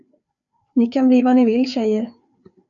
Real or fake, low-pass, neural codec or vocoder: fake; 7.2 kHz; codec, 16 kHz, 4 kbps, FreqCodec, larger model